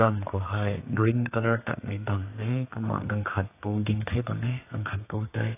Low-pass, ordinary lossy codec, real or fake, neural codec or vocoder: 3.6 kHz; AAC, 24 kbps; fake; codec, 32 kHz, 1.9 kbps, SNAC